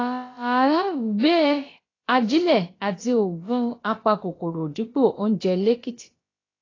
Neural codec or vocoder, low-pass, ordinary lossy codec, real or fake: codec, 16 kHz, about 1 kbps, DyCAST, with the encoder's durations; 7.2 kHz; AAC, 32 kbps; fake